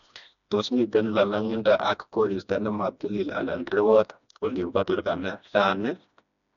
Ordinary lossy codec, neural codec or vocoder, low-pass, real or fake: none; codec, 16 kHz, 1 kbps, FreqCodec, smaller model; 7.2 kHz; fake